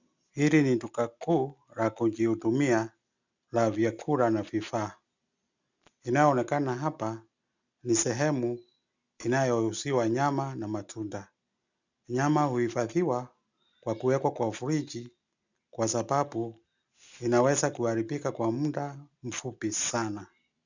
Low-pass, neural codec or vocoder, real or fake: 7.2 kHz; none; real